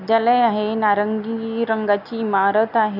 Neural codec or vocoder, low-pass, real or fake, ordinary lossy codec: none; 5.4 kHz; real; none